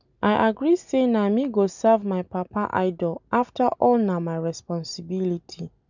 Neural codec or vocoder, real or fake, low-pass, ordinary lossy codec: none; real; 7.2 kHz; none